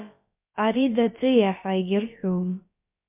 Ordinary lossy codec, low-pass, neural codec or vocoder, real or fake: MP3, 32 kbps; 3.6 kHz; codec, 16 kHz, about 1 kbps, DyCAST, with the encoder's durations; fake